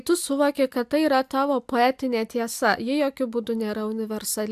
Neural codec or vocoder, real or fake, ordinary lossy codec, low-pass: none; real; AAC, 96 kbps; 14.4 kHz